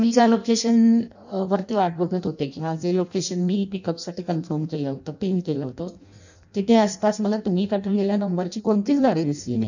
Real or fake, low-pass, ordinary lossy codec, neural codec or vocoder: fake; 7.2 kHz; none; codec, 16 kHz in and 24 kHz out, 0.6 kbps, FireRedTTS-2 codec